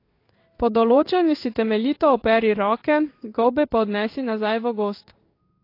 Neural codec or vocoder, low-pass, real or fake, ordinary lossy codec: codec, 16 kHz in and 24 kHz out, 1 kbps, XY-Tokenizer; 5.4 kHz; fake; AAC, 32 kbps